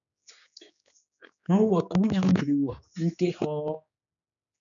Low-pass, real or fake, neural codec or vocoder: 7.2 kHz; fake; codec, 16 kHz, 4 kbps, X-Codec, HuBERT features, trained on general audio